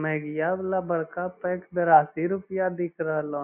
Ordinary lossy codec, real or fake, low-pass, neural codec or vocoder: none; real; 3.6 kHz; none